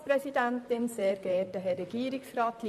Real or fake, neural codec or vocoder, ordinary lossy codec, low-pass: fake; vocoder, 44.1 kHz, 128 mel bands, Pupu-Vocoder; none; 14.4 kHz